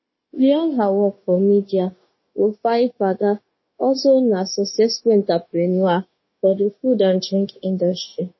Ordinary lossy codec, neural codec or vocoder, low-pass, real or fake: MP3, 24 kbps; codec, 16 kHz, 0.9 kbps, LongCat-Audio-Codec; 7.2 kHz; fake